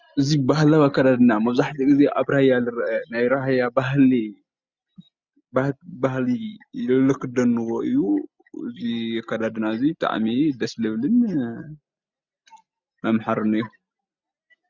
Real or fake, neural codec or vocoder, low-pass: real; none; 7.2 kHz